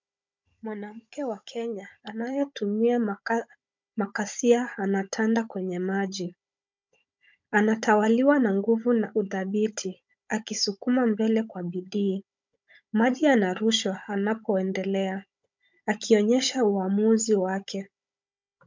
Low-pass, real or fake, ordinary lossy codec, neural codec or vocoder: 7.2 kHz; fake; MP3, 64 kbps; codec, 16 kHz, 16 kbps, FunCodec, trained on Chinese and English, 50 frames a second